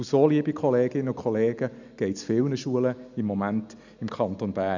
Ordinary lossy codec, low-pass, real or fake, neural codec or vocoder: none; 7.2 kHz; real; none